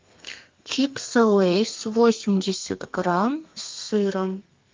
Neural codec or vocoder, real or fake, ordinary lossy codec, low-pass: codec, 32 kHz, 1.9 kbps, SNAC; fake; Opus, 24 kbps; 7.2 kHz